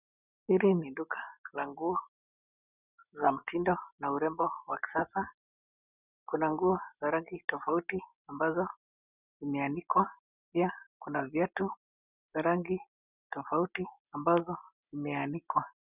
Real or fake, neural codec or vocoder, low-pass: real; none; 3.6 kHz